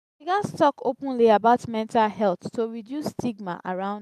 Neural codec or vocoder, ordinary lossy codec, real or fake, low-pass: none; none; real; 14.4 kHz